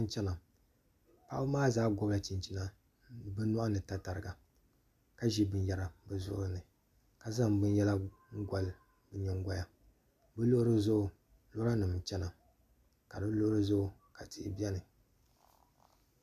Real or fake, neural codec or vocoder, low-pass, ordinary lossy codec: real; none; 14.4 kHz; MP3, 96 kbps